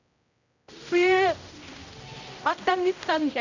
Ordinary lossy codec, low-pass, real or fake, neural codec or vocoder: none; 7.2 kHz; fake; codec, 16 kHz, 0.5 kbps, X-Codec, HuBERT features, trained on general audio